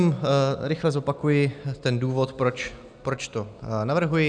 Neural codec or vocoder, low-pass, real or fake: none; 9.9 kHz; real